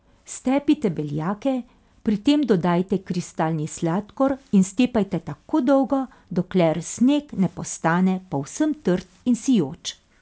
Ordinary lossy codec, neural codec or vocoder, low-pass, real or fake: none; none; none; real